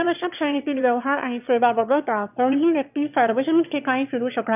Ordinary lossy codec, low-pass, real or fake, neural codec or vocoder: none; 3.6 kHz; fake; autoencoder, 22.05 kHz, a latent of 192 numbers a frame, VITS, trained on one speaker